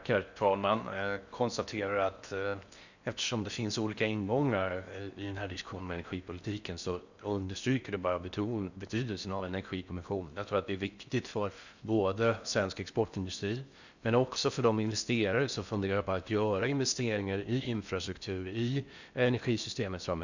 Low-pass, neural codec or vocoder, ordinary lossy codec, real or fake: 7.2 kHz; codec, 16 kHz in and 24 kHz out, 0.6 kbps, FocalCodec, streaming, 4096 codes; none; fake